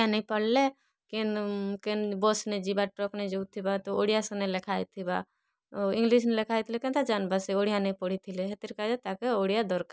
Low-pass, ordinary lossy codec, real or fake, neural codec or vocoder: none; none; real; none